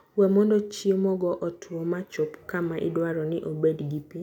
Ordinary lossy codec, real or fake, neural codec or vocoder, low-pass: none; real; none; 19.8 kHz